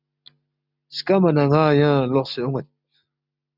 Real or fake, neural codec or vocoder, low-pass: real; none; 5.4 kHz